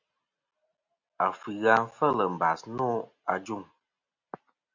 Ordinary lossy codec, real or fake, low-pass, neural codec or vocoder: Opus, 64 kbps; real; 7.2 kHz; none